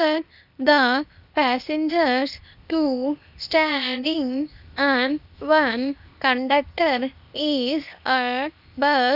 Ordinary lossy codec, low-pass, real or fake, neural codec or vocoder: none; 5.4 kHz; fake; codec, 16 kHz, 0.8 kbps, ZipCodec